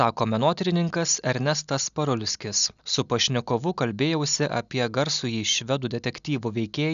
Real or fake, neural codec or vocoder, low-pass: real; none; 7.2 kHz